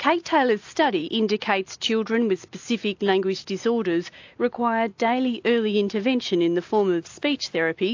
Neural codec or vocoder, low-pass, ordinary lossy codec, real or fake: none; 7.2 kHz; AAC, 48 kbps; real